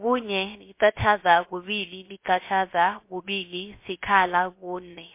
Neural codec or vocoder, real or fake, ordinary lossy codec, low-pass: codec, 16 kHz, 0.3 kbps, FocalCodec; fake; MP3, 24 kbps; 3.6 kHz